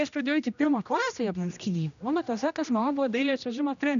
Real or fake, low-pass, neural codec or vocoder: fake; 7.2 kHz; codec, 16 kHz, 1 kbps, X-Codec, HuBERT features, trained on general audio